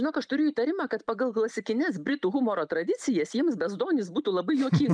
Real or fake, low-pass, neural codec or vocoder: real; 9.9 kHz; none